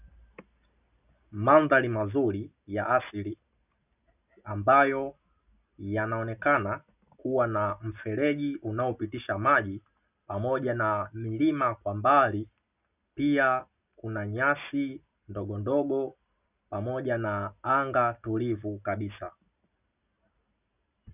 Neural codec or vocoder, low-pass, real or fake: none; 3.6 kHz; real